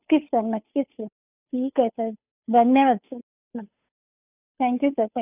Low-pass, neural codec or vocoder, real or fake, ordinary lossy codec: 3.6 kHz; codec, 16 kHz, 2 kbps, FunCodec, trained on Chinese and English, 25 frames a second; fake; none